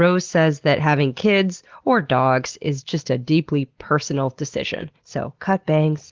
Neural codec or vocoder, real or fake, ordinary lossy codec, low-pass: none; real; Opus, 16 kbps; 7.2 kHz